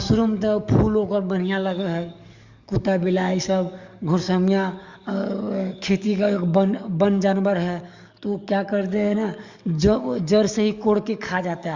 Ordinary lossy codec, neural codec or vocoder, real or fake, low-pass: Opus, 64 kbps; codec, 44.1 kHz, 7.8 kbps, DAC; fake; 7.2 kHz